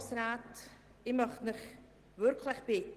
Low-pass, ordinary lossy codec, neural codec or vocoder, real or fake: 14.4 kHz; Opus, 16 kbps; none; real